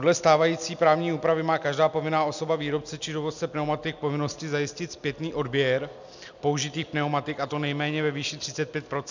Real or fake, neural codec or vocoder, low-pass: real; none; 7.2 kHz